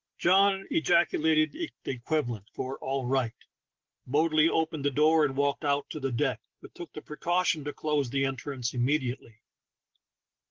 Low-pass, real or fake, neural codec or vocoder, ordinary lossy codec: 7.2 kHz; real; none; Opus, 16 kbps